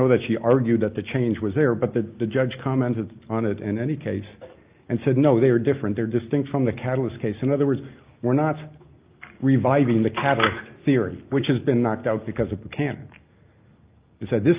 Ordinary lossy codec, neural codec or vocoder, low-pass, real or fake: Opus, 24 kbps; none; 3.6 kHz; real